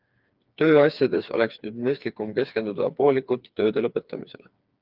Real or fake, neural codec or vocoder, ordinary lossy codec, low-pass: fake; codec, 16 kHz, 4 kbps, FreqCodec, smaller model; Opus, 32 kbps; 5.4 kHz